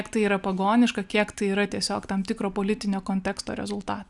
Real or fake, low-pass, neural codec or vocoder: real; 10.8 kHz; none